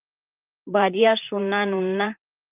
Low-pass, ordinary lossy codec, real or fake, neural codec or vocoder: 3.6 kHz; Opus, 24 kbps; fake; vocoder, 44.1 kHz, 128 mel bands, Pupu-Vocoder